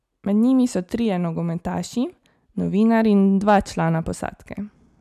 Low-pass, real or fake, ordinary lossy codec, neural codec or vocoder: 14.4 kHz; real; none; none